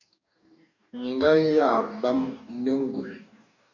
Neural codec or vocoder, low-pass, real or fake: codec, 44.1 kHz, 2.6 kbps, DAC; 7.2 kHz; fake